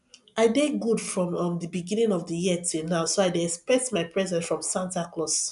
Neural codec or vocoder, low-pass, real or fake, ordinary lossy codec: none; 10.8 kHz; real; MP3, 96 kbps